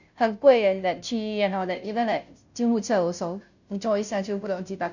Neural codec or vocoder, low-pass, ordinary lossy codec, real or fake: codec, 16 kHz, 0.5 kbps, FunCodec, trained on Chinese and English, 25 frames a second; 7.2 kHz; none; fake